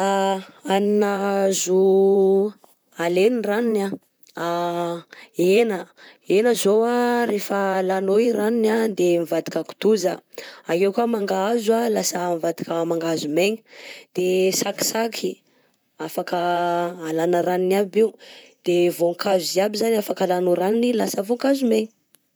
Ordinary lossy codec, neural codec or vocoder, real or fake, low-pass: none; vocoder, 44.1 kHz, 128 mel bands, Pupu-Vocoder; fake; none